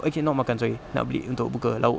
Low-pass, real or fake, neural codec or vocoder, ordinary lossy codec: none; real; none; none